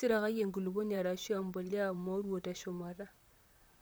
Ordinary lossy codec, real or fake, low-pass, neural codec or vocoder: none; fake; none; vocoder, 44.1 kHz, 128 mel bands, Pupu-Vocoder